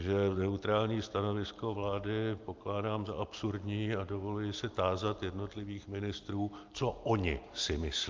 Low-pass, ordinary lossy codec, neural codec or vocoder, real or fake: 7.2 kHz; Opus, 24 kbps; none; real